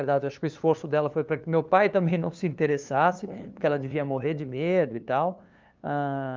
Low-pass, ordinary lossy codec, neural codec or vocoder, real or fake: 7.2 kHz; Opus, 24 kbps; codec, 16 kHz, 2 kbps, FunCodec, trained on LibriTTS, 25 frames a second; fake